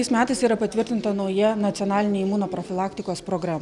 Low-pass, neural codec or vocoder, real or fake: 10.8 kHz; none; real